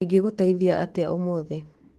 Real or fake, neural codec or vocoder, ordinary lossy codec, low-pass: fake; autoencoder, 48 kHz, 32 numbers a frame, DAC-VAE, trained on Japanese speech; Opus, 16 kbps; 14.4 kHz